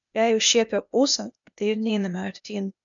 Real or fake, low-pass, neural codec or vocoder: fake; 7.2 kHz; codec, 16 kHz, 0.8 kbps, ZipCodec